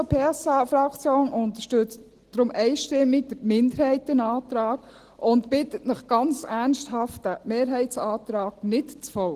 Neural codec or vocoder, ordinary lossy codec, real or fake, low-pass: none; Opus, 16 kbps; real; 14.4 kHz